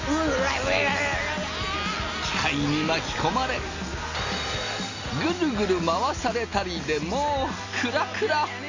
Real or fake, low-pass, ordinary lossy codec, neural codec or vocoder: real; 7.2 kHz; AAC, 32 kbps; none